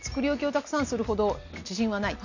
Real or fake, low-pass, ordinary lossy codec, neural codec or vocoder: real; 7.2 kHz; none; none